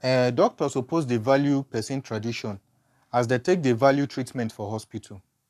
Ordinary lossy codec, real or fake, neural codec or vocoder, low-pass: none; fake; codec, 44.1 kHz, 7.8 kbps, Pupu-Codec; 14.4 kHz